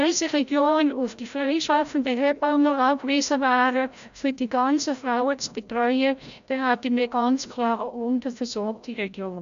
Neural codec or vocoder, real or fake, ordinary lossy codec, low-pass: codec, 16 kHz, 0.5 kbps, FreqCodec, larger model; fake; AAC, 96 kbps; 7.2 kHz